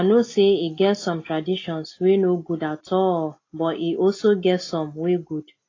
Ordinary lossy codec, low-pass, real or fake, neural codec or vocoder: AAC, 32 kbps; 7.2 kHz; real; none